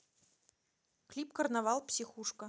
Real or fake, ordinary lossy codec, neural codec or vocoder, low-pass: real; none; none; none